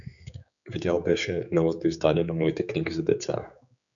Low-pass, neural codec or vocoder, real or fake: 7.2 kHz; codec, 16 kHz, 4 kbps, X-Codec, HuBERT features, trained on general audio; fake